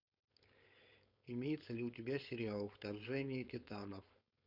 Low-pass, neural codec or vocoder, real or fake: 5.4 kHz; codec, 16 kHz, 4.8 kbps, FACodec; fake